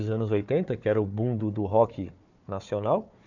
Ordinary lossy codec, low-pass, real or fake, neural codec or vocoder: none; 7.2 kHz; fake; codec, 16 kHz, 4 kbps, FunCodec, trained on Chinese and English, 50 frames a second